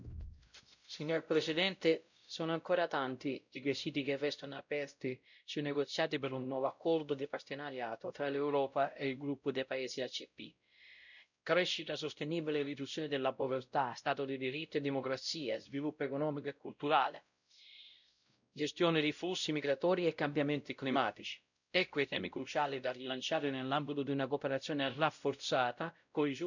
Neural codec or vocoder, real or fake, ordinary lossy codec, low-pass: codec, 16 kHz, 0.5 kbps, X-Codec, WavLM features, trained on Multilingual LibriSpeech; fake; none; 7.2 kHz